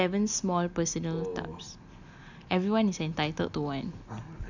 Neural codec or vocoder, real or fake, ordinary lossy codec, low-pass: none; real; none; 7.2 kHz